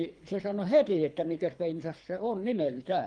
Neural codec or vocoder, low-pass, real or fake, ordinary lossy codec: codec, 24 kHz, 3 kbps, HILCodec; 9.9 kHz; fake; Opus, 32 kbps